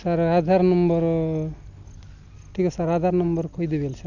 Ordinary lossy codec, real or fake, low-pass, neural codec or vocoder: none; real; 7.2 kHz; none